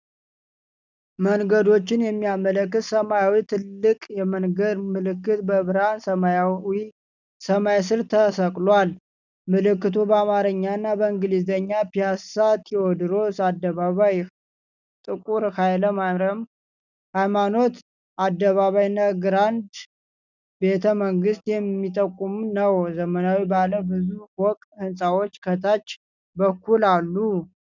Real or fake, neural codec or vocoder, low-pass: real; none; 7.2 kHz